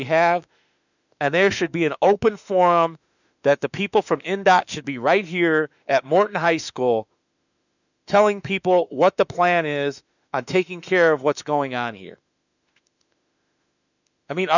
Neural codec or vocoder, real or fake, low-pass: autoencoder, 48 kHz, 32 numbers a frame, DAC-VAE, trained on Japanese speech; fake; 7.2 kHz